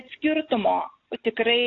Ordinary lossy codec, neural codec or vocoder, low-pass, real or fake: AAC, 48 kbps; none; 7.2 kHz; real